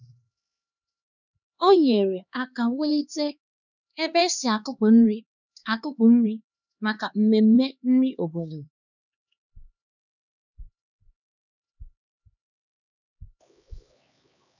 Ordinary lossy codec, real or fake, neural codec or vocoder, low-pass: none; fake; codec, 16 kHz, 2 kbps, X-Codec, HuBERT features, trained on LibriSpeech; 7.2 kHz